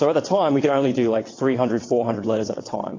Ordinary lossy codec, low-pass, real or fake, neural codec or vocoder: AAC, 32 kbps; 7.2 kHz; fake; vocoder, 22.05 kHz, 80 mel bands, WaveNeXt